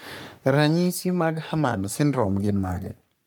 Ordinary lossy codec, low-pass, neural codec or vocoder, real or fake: none; none; codec, 44.1 kHz, 3.4 kbps, Pupu-Codec; fake